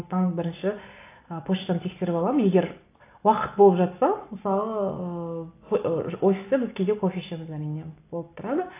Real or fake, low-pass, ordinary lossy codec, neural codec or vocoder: real; 3.6 kHz; AAC, 24 kbps; none